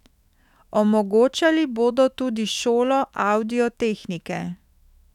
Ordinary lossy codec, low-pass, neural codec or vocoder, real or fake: none; 19.8 kHz; autoencoder, 48 kHz, 128 numbers a frame, DAC-VAE, trained on Japanese speech; fake